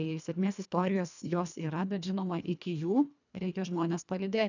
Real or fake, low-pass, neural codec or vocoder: fake; 7.2 kHz; codec, 24 kHz, 1.5 kbps, HILCodec